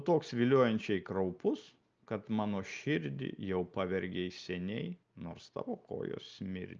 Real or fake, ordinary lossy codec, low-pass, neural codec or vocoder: real; Opus, 24 kbps; 7.2 kHz; none